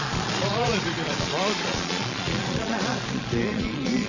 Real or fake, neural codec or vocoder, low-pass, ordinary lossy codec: fake; vocoder, 22.05 kHz, 80 mel bands, Vocos; 7.2 kHz; none